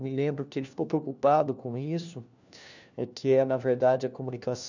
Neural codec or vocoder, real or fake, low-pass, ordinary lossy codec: codec, 16 kHz, 1 kbps, FunCodec, trained on LibriTTS, 50 frames a second; fake; 7.2 kHz; none